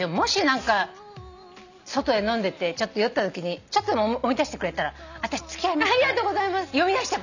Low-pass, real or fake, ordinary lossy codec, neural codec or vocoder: 7.2 kHz; real; none; none